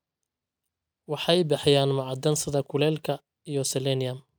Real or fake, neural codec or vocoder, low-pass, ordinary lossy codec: real; none; none; none